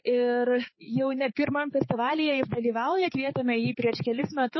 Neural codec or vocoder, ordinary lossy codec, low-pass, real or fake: codec, 16 kHz, 4 kbps, X-Codec, HuBERT features, trained on balanced general audio; MP3, 24 kbps; 7.2 kHz; fake